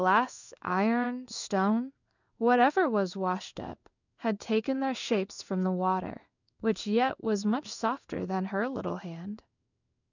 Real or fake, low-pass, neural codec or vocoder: fake; 7.2 kHz; codec, 16 kHz in and 24 kHz out, 1 kbps, XY-Tokenizer